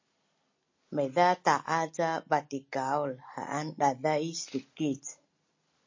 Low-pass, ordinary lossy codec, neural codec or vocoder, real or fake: 7.2 kHz; MP3, 32 kbps; none; real